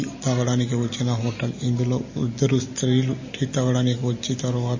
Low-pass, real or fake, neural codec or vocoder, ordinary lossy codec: 7.2 kHz; real; none; MP3, 32 kbps